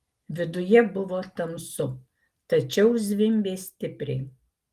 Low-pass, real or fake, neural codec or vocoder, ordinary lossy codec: 14.4 kHz; real; none; Opus, 24 kbps